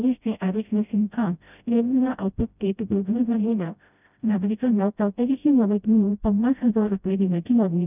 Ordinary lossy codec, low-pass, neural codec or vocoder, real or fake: none; 3.6 kHz; codec, 16 kHz, 0.5 kbps, FreqCodec, smaller model; fake